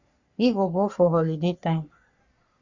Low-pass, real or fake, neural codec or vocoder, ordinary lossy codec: 7.2 kHz; fake; codec, 44.1 kHz, 3.4 kbps, Pupu-Codec; Opus, 64 kbps